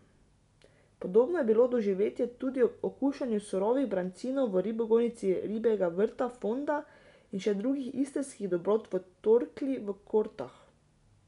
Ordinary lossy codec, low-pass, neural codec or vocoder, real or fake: none; 10.8 kHz; none; real